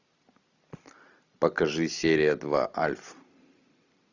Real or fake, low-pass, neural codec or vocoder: real; 7.2 kHz; none